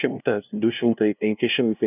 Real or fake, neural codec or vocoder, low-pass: fake; codec, 16 kHz, 0.5 kbps, FunCodec, trained on LibriTTS, 25 frames a second; 3.6 kHz